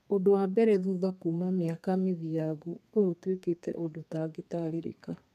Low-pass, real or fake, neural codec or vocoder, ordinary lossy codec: 14.4 kHz; fake; codec, 32 kHz, 1.9 kbps, SNAC; none